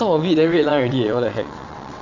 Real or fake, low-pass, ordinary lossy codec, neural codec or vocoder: fake; 7.2 kHz; none; vocoder, 22.05 kHz, 80 mel bands, WaveNeXt